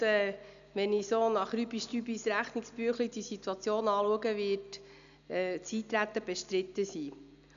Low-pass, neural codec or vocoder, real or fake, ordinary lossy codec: 7.2 kHz; none; real; none